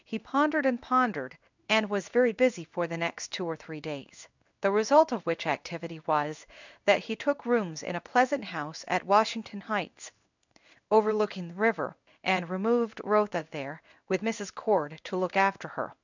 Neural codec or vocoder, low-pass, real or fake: codec, 16 kHz in and 24 kHz out, 1 kbps, XY-Tokenizer; 7.2 kHz; fake